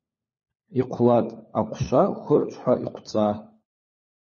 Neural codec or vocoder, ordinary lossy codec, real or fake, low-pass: codec, 16 kHz, 4 kbps, FunCodec, trained on LibriTTS, 50 frames a second; MP3, 32 kbps; fake; 7.2 kHz